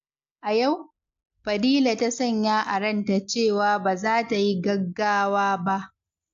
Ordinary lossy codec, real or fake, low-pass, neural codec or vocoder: AAC, 64 kbps; real; 7.2 kHz; none